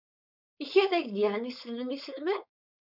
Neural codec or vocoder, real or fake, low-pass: codec, 16 kHz, 4.8 kbps, FACodec; fake; 5.4 kHz